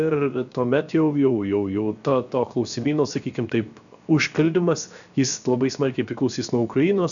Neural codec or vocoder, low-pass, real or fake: codec, 16 kHz, 0.7 kbps, FocalCodec; 7.2 kHz; fake